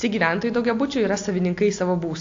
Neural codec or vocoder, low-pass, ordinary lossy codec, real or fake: none; 7.2 kHz; AAC, 48 kbps; real